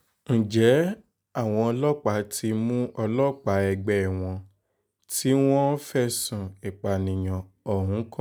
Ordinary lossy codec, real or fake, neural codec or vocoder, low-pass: none; real; none; none